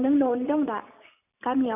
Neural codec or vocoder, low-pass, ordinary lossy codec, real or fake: none; 3.6 kHz; none; real